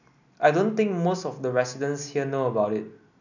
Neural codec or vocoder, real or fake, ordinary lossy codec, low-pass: none; real; none; 7.2 kHz